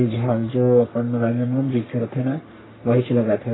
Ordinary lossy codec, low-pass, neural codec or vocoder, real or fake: AAC, 16 kbps; 7.2 kHz; codec, 44.1 kHz, 3.4 kbps, Pupu-Codec; fake